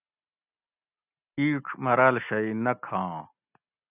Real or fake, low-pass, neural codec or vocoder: real; 3.6 kHz; none